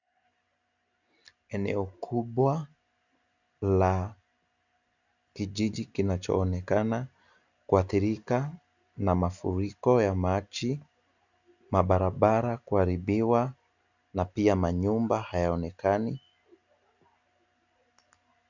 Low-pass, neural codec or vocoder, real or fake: 7.2 kHz; none; real